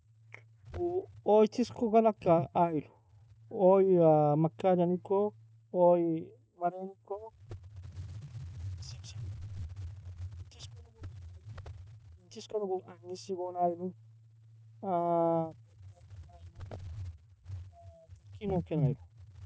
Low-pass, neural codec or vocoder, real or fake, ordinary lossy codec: none; none; real; none